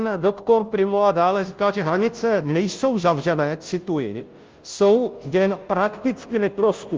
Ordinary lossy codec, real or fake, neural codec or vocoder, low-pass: Opus, 24 kbps; fake; codec, 16 kHz, 0.5 kbps, FunCodec, trained on Chinese and English, 25 frames a second; 7.2 kHz